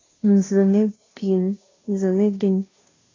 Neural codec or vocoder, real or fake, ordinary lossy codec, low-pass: codec, 16 kHz, 0.5 kbps, FunCodec, trained on Chinese and English, 25 frames a second; fake; AAC, 32 kbps; 7.2 kHz